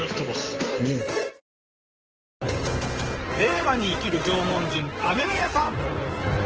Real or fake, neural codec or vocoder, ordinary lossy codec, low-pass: fake; codec, 16 kHz in and 24 kHz out, 2.2 kbps, FireRedTTS-2 codec; Opus, 16 kbps; 7.2 kHz